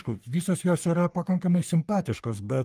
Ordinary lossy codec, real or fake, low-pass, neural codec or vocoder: Opus, 32 kbps; fake; 14.4 kHz; codec, 44.1 kHz, 3.4 kbps, Pupu-Codec